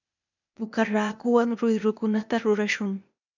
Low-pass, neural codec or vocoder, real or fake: 7.2 kHz; codec, 16 kHz, 0.8 kbps, ZipCodec; fake